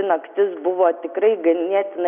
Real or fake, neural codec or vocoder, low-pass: real; none; 3.6 kHz